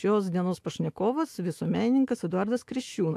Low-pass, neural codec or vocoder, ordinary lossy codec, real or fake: 14.4 kHz; autoencoder, 48 kHz, 128 numbers a frame, DAC-VAE, trained on Japanese speech; AAC, 64 kbps; fake